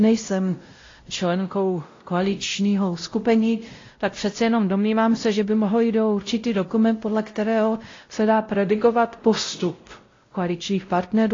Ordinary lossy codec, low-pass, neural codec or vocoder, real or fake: AAC, 32 kbps; 7.2 kHz; codec, 16 kHz, 0.5 kbps, X-Codec, WavLM features, trained on Multilingual LibriSpeech; fake